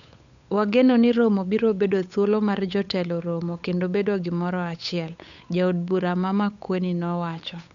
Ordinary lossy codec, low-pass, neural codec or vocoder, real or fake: none; 7.2 kHz; codec, 16 kHz, 8 kbps, FunCodec, trained on Chinese and English, 25 frames a second; fake